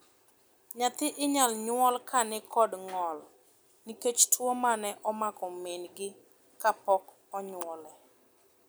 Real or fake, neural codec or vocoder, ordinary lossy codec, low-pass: real; none; none; none